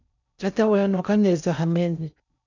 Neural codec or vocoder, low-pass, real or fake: codec, 16 kHz in and 24 kHz out, 0.6 kbps, FocalCodec, streaming, 2048 codes; 7.2 kHz; fake